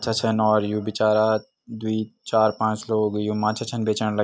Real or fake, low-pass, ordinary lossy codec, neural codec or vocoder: real; none; none; none